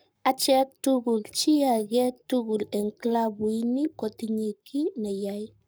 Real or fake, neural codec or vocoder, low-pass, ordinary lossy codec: fake; codec, 44.1 kHz, 7.8 kbps, Pupu-Codec; none; none